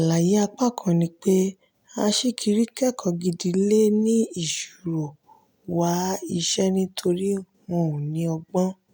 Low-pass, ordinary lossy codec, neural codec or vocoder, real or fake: none; none; none; real